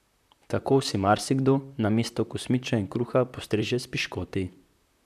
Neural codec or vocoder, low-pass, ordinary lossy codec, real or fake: vocoder, 44.1 kHz, 128 mel bands, Pupu-Vocoder; 14.4 kHz; none; fake